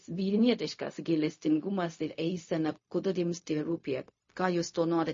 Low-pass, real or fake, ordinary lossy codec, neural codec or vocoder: 7.2 kHz; fake; MP3, 32 kbps; codec, 16 kHz, 0.4 kbps, LongCat-Audio-Codec